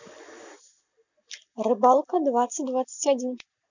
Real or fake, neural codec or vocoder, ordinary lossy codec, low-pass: real; none; none; 7.2 kHz